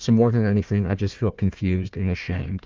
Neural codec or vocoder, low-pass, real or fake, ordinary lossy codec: codec, 16 kHz, 1 kbps, FunCodec, trained on Chinese and English, 50 frames a second; 7.2 kHz; fake; Opus, 32 kbps